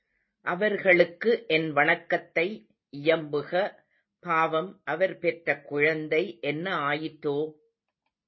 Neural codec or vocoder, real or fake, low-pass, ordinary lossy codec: none; real; 7.2 kHz; MP3, 24 kbps